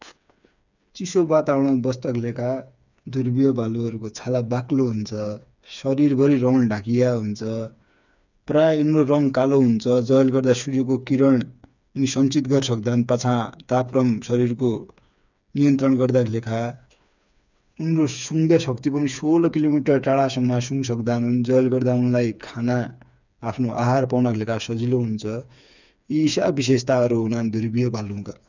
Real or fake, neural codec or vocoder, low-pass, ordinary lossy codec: fake; codec, 16 kHz, 4 kbps, FreqCodec, smaller model; 7.2 kHz; none